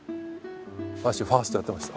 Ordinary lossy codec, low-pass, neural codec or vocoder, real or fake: none; none; none; real